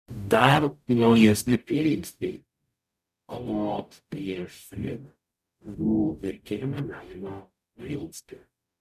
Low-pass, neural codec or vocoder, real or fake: 14.4 kHz; codec, 44.1 kHz, 0.9 kbps, DAC; fake